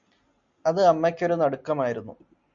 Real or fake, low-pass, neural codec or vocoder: real; 7.2 kHz; none